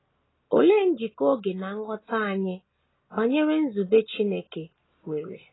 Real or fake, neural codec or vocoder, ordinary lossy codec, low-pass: real; none; AAC, 16 kbps; 7.2 kHz